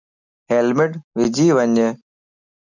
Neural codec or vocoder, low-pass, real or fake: none; 7.2 kHz; real